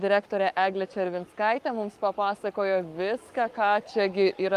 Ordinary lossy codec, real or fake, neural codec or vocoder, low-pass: Opus, 32 kbps; fake; codec, 44.1 kHz, 7.8 kbps, Pupu-Codec; 14.4 kHz